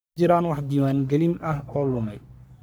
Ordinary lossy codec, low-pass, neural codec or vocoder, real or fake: none; none; codec, 44.1 kHz, 3.4 kbps, Pupu-Codec; fake